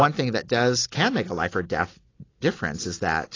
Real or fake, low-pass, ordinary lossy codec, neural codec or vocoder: real; 7.2 kHz; AAC, 32 kbps; none